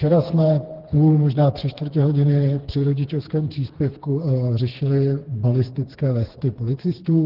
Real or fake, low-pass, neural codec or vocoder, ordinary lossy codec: fake; 5.4 kHz; codec, 16 kHz, 4 kbps, FreqCodec, smaller model; Opus, 16 kbps